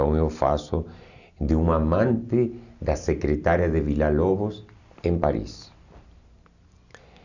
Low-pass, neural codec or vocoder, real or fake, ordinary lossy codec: 7.2 kHz; none; real; none